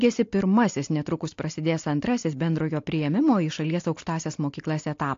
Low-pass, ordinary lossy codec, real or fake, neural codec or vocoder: 7.2 kHz; AAC, 48 kbps; real; none